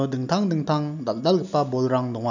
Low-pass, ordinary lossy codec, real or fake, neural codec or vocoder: 7.2 kHz; none; real; none